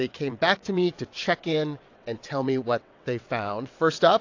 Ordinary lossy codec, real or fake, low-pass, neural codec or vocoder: AAC, 48 kbps; fake; 7.2 kHz; vocoder, 22.05 kHz, 80 mel bands, WaveNeXt